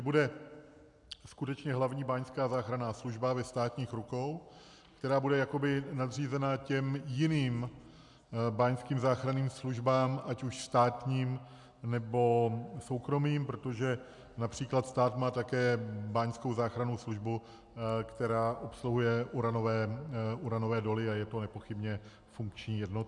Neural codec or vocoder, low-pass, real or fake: none; 10.8 kHz; real